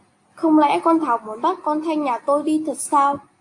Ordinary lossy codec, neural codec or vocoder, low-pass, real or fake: AAC, 64 kbps; none; 10.8 kHz; real